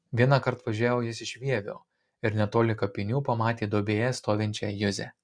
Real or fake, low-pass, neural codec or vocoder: real; 9.9 kHz; none